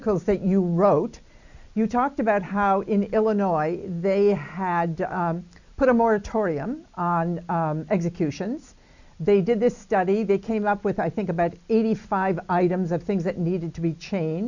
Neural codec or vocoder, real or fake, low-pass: none; real; 7.2 kHz